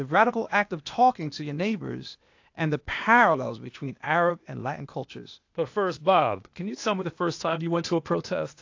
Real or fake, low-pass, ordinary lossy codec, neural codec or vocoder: fake; 7.2 kHz; AAC, 48 kbps; codec, 16 kHz, 0.8 kbps, ZipCodec